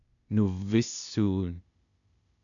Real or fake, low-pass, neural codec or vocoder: fake; 7.2 kHz; codec, 16 kHz, 0.8 kbps, ZipCodec